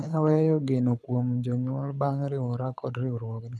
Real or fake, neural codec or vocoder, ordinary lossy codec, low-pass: fake; codec, 24 kHz, 6 kbps, HILCodec; none; none